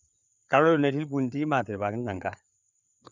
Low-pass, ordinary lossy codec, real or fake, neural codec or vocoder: 7.2 kHz; none; fake; codec, 16 kHz, 4 kbps, FreqCodec, larger model